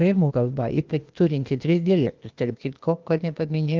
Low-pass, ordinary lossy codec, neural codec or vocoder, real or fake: 7.2 kHz; Opus, 32 kbps; codec, 16 kHz, 0.8 kbps, ZipCodec; fake